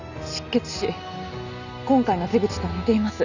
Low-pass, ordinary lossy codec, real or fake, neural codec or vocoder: 7.2 kHz; none; real; none